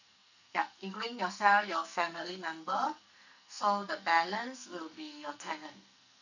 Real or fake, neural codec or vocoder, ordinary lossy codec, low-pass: fake; codec, 44.1 kHz, 2.6 kbps, SNAC; none; 7.2 kHz